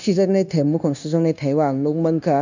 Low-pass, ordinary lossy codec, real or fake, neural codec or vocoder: 7.2 kHz; none; fake; codec, 16 kHz, 0.9 kbps, LongCat-Audio-Codec